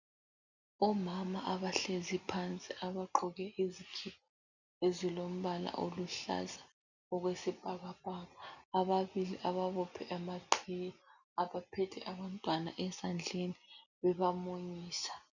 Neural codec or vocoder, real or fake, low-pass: none; real; 7.2 kHz